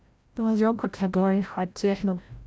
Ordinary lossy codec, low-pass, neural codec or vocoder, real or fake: none; none; codec, 16 kHz, 0.5 kbps, FreqCodec, larger model; fake